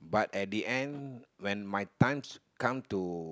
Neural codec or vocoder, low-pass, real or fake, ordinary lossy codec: none; none; real; none